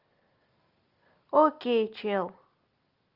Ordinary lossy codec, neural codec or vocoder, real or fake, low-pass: Opus, 32 kbps; none; real; 5.4 kHz